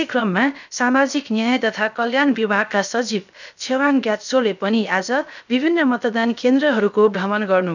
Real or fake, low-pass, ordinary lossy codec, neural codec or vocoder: fake; 7.2 kHz; none; codec, 16 kHz, 0.7 kbps, FocalCodec